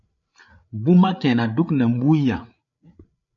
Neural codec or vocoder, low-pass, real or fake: codec, 16 kHz, 8 kbps, FreqCodec, larger model; 7.2 kHz; fake